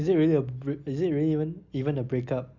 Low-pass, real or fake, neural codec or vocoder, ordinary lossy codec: 7.2 kHz; real; none; none